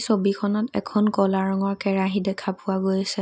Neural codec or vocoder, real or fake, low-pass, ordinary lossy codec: none; real; none; none